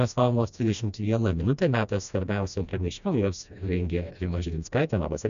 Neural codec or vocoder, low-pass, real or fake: codec, 16 kHz, 1 kbps, FreqCodec, smaller model; 7.2 kHz; fake